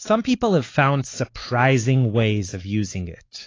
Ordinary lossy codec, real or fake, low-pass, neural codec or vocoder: AAC, 32 kbps; fake; 7.2 kHz; codec, 16 kHz, 4 kbps, X-Codec, WavLM features, trained on Multilingual LibriSpeech